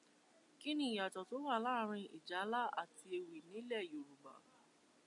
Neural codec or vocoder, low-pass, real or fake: none; 10.8 kHz; real